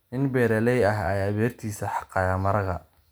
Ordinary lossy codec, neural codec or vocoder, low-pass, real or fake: none; none; none; real